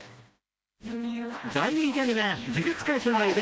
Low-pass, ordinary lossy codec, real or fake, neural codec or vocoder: none; none; fake; codec, 16 kHz, 1 kbps, FreqCodec, smaller model